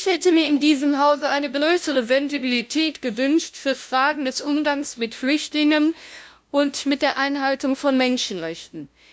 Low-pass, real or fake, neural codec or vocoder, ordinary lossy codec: none; fake; codec, 16 kHz, 0.5 kbps, FunCodec, trained on LibriTTS, 25 frames a second; none